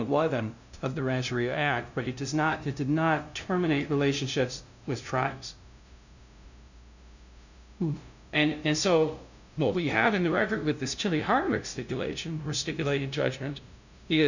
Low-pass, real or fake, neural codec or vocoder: 7.2 kHz; fake; codec, 16 kHz, 0.5 kbps, FunCodec, trained on LibriTTS, 25 frames a second